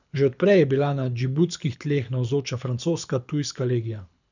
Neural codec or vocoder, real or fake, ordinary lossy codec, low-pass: codec, 24 kHz, 6 kbps, HILCodec; fake; none; 7.2 kHz